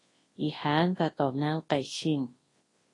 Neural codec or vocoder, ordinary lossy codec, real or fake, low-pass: codec, 24 kHz, 0.9 kbps, WavTokenizer, large speech release; AAC, 32 kbps; fake; 10.8 kHz